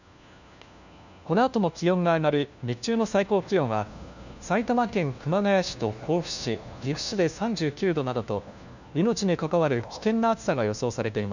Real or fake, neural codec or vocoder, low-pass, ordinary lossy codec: fake; codec, 16 kHz, 1 kbps, FunCodec, trained on LibriTTS, 50 frames a second; 7.2 kHz; none